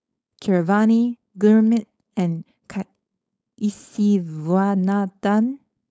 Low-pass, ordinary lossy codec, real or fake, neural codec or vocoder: none; none; fake; codec, 16 kHz, 4.8 kbps, FACodec